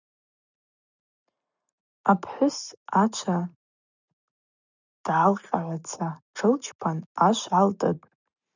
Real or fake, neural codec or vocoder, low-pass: real; none; 7.2 kHz